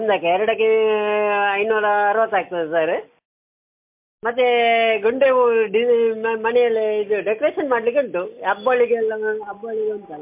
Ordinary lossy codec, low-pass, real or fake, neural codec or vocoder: MP3, 32 kbps; 3.6 kHz; real; none